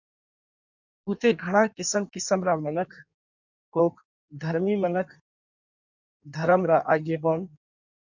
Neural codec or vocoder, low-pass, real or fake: codec, 16 kHz in and 24 kHz out, 1.1 kbps, FireRedTTS-2 codec; 7.2 kHz; fake